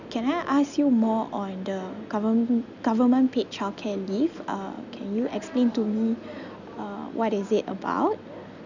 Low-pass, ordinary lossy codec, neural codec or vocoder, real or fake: 7.2 kHz; none; none; real